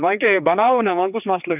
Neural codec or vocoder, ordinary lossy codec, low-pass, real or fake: codec, 16 kHz, 4 kbps, X-Codec, HuBERT features, trained on general audio; none; 3.6 kHz; fake